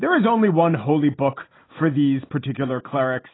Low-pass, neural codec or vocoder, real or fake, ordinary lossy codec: 7.2 kHz; none; real; AAC, 16 kbps